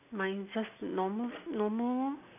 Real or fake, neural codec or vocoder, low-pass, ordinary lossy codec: real; none; 3.6 kHz; none